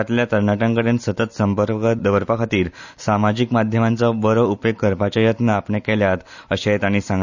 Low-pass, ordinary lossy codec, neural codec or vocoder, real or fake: 7.2 kHz; none; none; real